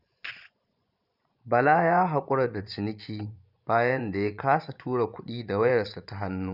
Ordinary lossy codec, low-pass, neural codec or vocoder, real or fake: none; 5.4 kHz; vocoder, 24 kHz, 100 mel bands, Vocos; fake